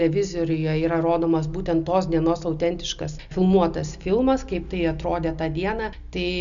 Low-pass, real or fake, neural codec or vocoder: 7.2 kHz; real; none